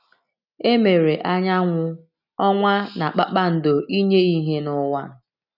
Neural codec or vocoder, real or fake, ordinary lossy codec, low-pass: none; real; none; 5.4 kHz